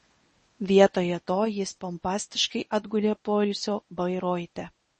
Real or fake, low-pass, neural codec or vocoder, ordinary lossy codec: fake; 10.8 kHz; codec, 24 kHz, 0.9 kbps, WavTokenizer, medium speech release version 1; MP3, 32 kbps